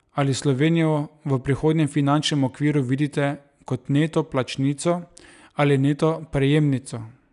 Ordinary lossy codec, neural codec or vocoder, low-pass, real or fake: none; none; 10.8 kHz; real